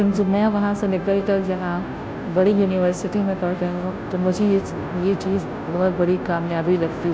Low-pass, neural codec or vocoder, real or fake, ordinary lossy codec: none; codec, 16 kHz, 0.5 kbps, FunCodec, trained on Chinese and English, 25 frames a second; fake; none